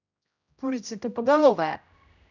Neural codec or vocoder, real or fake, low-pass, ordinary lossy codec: codec, 16 kHz, 0.5 kbps, X-Codec, HuBERT features, trained on general audio; fake; 7.2 kHz; none